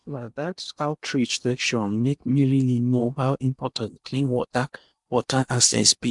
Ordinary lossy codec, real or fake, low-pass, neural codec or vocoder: MP3, 96 kbps; fake; 10.8 kHz; codec, 16 kHz in and 24 kHz out, 0.8 kbps, FocalCodec, streaming, 65536 codes